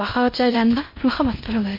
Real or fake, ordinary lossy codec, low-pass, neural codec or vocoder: fake; MP3, 32 kbps; 5.4 kHz; codec, 16 kHz in and 24 kHz out, 0.8 kbps, FocalCodec, streaming, 65536 codes